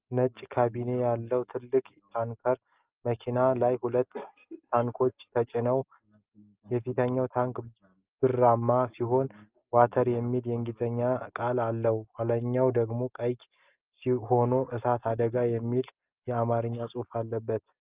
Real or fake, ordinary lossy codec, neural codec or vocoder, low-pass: real; Opus, 32 kbps; none; 3.6 kHz